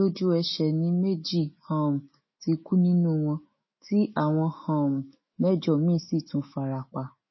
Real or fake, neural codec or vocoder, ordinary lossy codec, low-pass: fake; autoencoder, 48 kHz, 128 numbers a frame, DAC-VAE, trained on Japanese speech; MP3, 24 kbps; 7.2 kHz